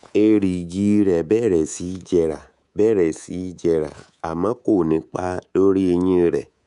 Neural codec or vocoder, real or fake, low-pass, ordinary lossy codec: codec, 24 kHz, 3.1 kbps, DualCodec; fake; 10.8 kHz; none